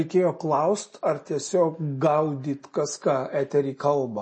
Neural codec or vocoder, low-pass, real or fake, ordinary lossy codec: none; 9.9 kHz; real; MP3, 32 kbps